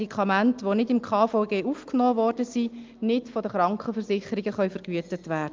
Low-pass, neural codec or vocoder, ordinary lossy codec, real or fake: 7.2 kHz; none; Opus, 24 kbps; real